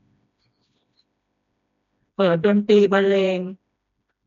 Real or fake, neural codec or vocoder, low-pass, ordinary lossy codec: fake; codec, 16 kHz, 1 kbps, FreqCodec, smaller model; 7.2 kHz; none